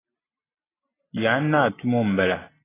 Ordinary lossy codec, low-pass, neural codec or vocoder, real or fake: AAC, 16 kbps; 3.6 kHz; none; real